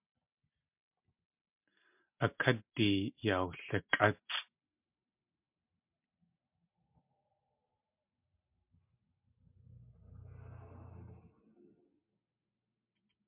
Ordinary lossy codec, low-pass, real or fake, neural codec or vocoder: MP3, 32 kbps; 3.6 kHz; real; none